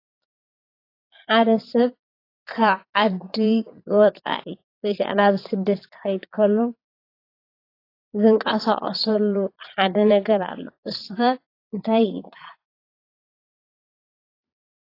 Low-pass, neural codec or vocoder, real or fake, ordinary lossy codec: 5.4 kHz; vocoder, 22.05 kHz, 80 mel bands, Vocos; fake; AAC, 32 kbps